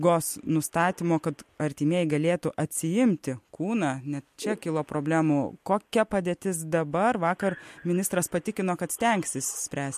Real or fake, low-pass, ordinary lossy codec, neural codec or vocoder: real; 14.4 kHz; MP3, 64 kbps; none